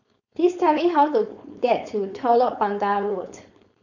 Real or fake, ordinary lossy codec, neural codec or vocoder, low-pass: fake; none; codec, 16 kHz, 4.8 kbps, FACodec; 7.2 kHz